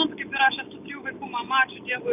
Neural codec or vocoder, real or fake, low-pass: none; real; 3.6 kHz